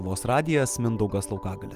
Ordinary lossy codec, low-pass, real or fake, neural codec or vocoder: Opus, 32 kbps; 14.4 kHz; real; none